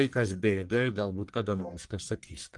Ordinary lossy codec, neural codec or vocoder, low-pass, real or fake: Opus, 24 kbps; codec, 44.1 kHz, 1.7 kbps, Pupu-Codec; 10.8 kHz; fake